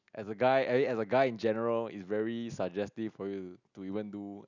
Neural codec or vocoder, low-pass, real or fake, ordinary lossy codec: none; 7.2 kHz; real; none